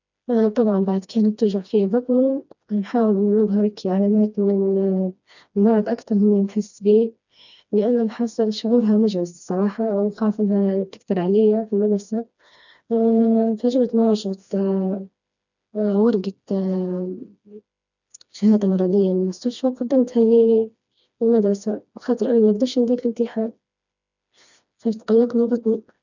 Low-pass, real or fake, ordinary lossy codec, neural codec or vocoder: 7.2 kHz; fake; none; codec, 16 kHz, 2 kbps, FreqCodec, smaller model